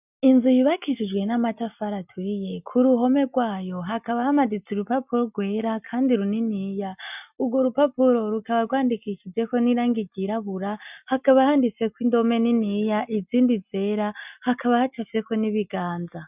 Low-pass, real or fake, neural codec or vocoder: 3.6 kHz; real; none